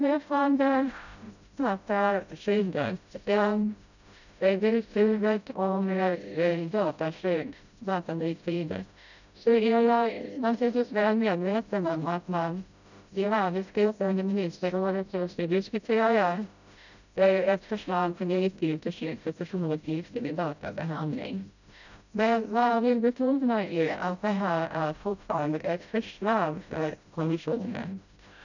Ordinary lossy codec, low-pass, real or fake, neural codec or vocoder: none; 7.2 kHz; fake; codec, 16 kHz, 0.5 kbps, FreqCodec, smaller model